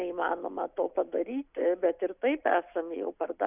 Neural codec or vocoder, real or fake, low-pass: none; real; 3.6 kHz